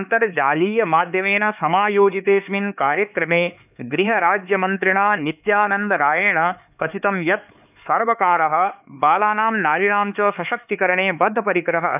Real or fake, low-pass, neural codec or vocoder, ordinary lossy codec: fake; 3.6 kHz; codec, 16 kHz, 4 kbps, X-Codec, HuBERT features, trained on LibriSpeech; none